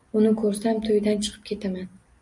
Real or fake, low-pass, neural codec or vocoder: real; 10.8 kHz; none